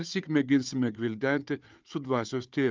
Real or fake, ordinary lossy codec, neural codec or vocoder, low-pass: fake; Opus, 24 kbps; codec, 16 kHz, 4 kbps, FunCodec, trained on Chinese and English, 50 frames a second; 7.2 kHz